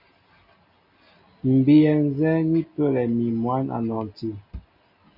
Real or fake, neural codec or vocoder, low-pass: real; none; 5.4 kHz